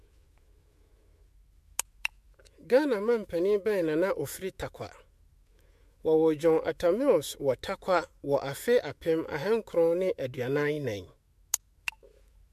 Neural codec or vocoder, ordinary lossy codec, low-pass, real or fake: autoencoder, 48 kHz, 128 numbers a frame, DAC-VAE, trained on Japanese speech; MP3, 64 kbps; 14.4 kHz; fake